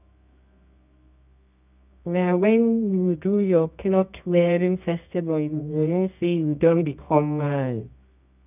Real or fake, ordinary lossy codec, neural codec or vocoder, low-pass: fake; none; codec, 24 kHz, 0.9 kbps, WavTokenizer, medium music audio release; 3.6 kHz